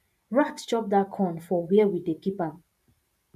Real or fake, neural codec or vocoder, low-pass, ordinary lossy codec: fake; vocoder, 44.1 kHz, 128 mel bands every 512 samples, BigVGAN v2; 14.4 kHz; none